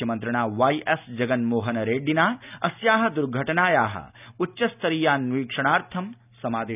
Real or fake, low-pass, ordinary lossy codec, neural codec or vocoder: real; 3.6 kHz; none; none